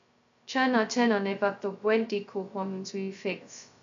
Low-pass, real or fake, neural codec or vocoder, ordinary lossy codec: 7.2 kHz; fake; codec, 16 kHz, 0.2 kbps, FocalCodec; AAC, 64 kbps